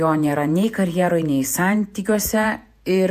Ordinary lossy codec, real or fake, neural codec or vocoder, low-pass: AAC, 96 kbps; fake; vocoder, 48 kHz, 128 mel bands, Vocos; 14.4 kHz